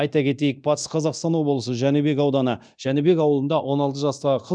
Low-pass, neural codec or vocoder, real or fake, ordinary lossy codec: 9.9 kHz; codec, 24 kHz, 0.9 kbps, DualCodec; fake; none